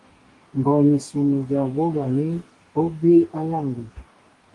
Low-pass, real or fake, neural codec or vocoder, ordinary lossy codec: 10.8 kHz; fake; codec, 44.1 kHz, 2.6 kbps, DAC; Opus, 24 kbps